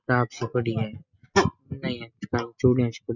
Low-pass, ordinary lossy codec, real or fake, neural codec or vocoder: 7.2 kHz; none; real; none